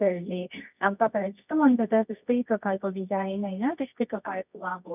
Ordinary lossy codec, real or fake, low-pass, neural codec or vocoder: none; fake; 3.6 kHz; codec, 24 kHz, 0.9 kbps, WavTokenizer, medium music audio release